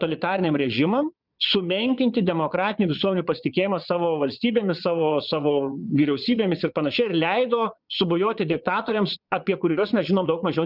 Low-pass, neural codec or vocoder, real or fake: 5.4 kHz; vocoder, 22.05 kHz, 80 mel bands, Vocos; fake